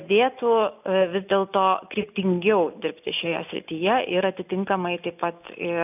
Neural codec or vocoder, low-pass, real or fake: none; 3.6 kHz; real